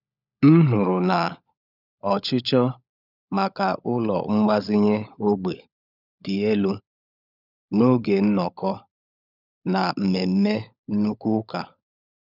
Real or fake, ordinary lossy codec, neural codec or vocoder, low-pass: fake; none; codec, 16 kHz, 16 kbps, FunCodec, trained on LibriTTS, 50 frames a second; 5.4 kHz